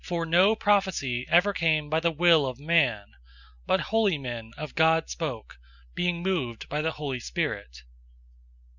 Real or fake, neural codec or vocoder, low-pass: real; none; 7.2 kHz